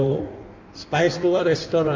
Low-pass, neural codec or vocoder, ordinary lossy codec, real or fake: 7.2 kHz; codec, 16 kHz in and 24 kHz out, 1.1 kbps, FireRedTTS-2 codec; MP3, 64 kbps; fake